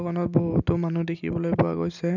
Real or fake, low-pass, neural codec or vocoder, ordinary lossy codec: real; 7.2 kHz; none; none